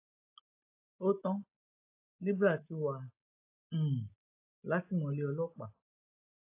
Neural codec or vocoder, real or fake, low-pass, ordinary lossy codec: none; real; 3.6 kHz; AAC, 24 kbps